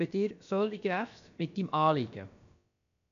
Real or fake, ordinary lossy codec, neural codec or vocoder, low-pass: fake; none; codec, 16 kHz, about 1 kbps, DyCAST, with the encoder's durations; 7.2 kHz